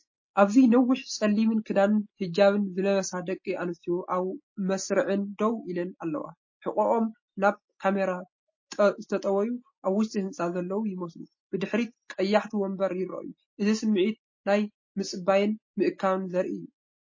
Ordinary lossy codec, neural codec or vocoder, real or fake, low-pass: MP3, 32 kbps; none; real; 7.2 kHz